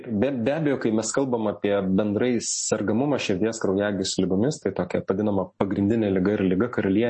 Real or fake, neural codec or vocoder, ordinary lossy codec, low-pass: real; none; MP3, 32 kbps; 10.8 kHz